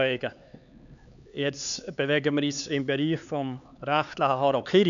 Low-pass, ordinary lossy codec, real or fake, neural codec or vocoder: 7.2 kHz; none; fake; codec, 16 kHz, 4 kbps, X-Codec, HuBERT features, trained on LibriSpeech